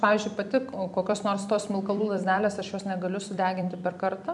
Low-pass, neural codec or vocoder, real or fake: 10.8 kHz; vocoder, 44.1 kHz, 128 mel bands every 256 samples, BigVGAN v2; fake